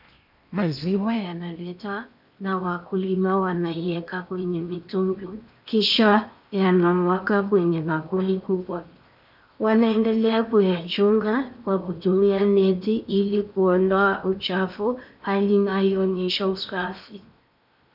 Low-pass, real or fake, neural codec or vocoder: 5.4 kHz; fake; codec, 16 kHz in and 24 kHz out, 0.8 kbps, FocalCodec, streaming, 65536 codes